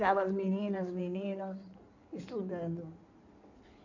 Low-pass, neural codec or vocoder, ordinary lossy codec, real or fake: 7.2 kHz; codec, 16 kHz in and 24 kHz out, 2.2 kbps, FireRedTTS-2 codec; none; fake